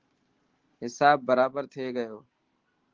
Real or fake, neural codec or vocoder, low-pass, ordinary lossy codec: real; none; 7.2 kHz; Opus, 16 kbps